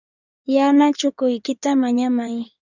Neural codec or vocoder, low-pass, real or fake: codec, 16 kHz in and 24 kHz out, 2.2 kbps, FireRedTTS-2 codec; 7.2 kHz; fake